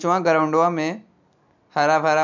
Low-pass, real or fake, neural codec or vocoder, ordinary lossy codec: 7.2 kHz; real; none; none